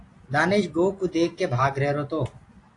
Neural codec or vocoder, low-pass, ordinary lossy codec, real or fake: none; 10.8 kHz; AAC, 48 kbps; real